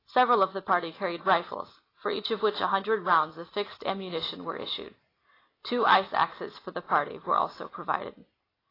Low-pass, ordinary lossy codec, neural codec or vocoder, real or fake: 5.4 kHz; AAC, 24 kbps; none; real